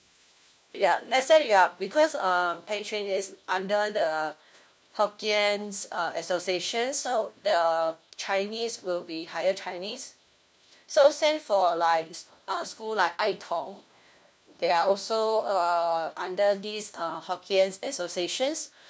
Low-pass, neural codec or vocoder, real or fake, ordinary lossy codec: none; codec, 16 kHz, 1 kbps, FunCodec, trained on LibriTTS, 50 frames a second; fake; none